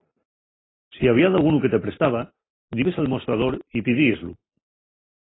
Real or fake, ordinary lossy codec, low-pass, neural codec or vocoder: real; AAC, 16 kbps; 7.2 kHz; none